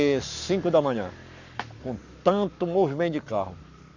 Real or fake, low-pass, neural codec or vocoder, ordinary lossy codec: fake; 7.2 kHz; codec, 44.1 kHz, 7.8 kbps, Pupu-Codec; none